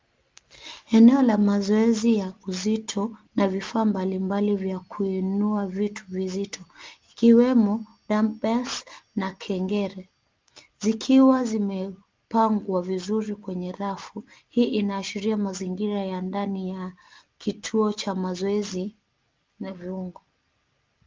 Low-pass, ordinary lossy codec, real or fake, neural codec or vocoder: 7.2 kHz; Opus, 32 kbps; real; none